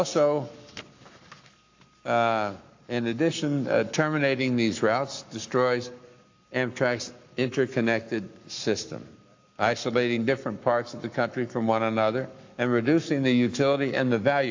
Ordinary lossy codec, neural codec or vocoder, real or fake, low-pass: AAC, 48 kbps; codec, 44.1 kHz, 7.8 kbps, Pupu-Codec; fake; 7.2 kHz